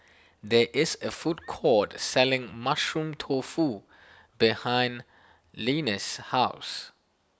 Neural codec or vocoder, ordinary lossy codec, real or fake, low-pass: none; none; real; none